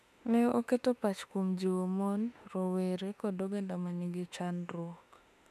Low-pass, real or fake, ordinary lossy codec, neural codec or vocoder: 14.4 kHz; fake; none; autoencoder, 48 kHz, 32 numbers a frame, DAC-VAE, trained on Japanese speech